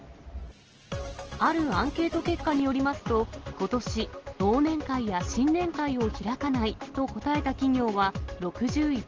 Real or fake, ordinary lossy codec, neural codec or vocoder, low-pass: real; Opus, 16 kbps; none; 7.2 kHz